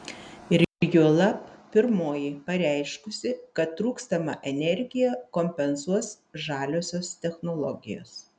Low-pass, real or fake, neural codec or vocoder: 9.9 kHz; real; none